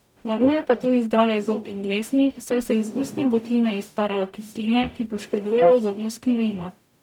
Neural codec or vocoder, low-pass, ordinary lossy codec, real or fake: codec, 44.1 kHz, 0.9 kbps, DAC; 19.8 kHz; none; fake